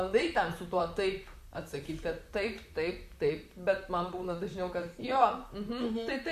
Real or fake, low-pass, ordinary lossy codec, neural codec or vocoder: fake; 14.4 kHz; MP3, 96 kbps; vocoder, 44.1 kHz, 128 mel bands, Pupu-Vocoder